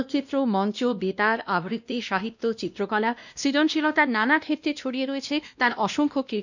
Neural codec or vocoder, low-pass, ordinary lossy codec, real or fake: codec, 16 kHz, 1 kbps, X-Codec, WavLM features, trained on Multilingual LibriSpeech; 7.2 kHz; none; fake